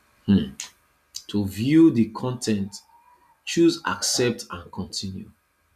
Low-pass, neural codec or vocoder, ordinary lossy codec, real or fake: 14.4 kHz; none; none; real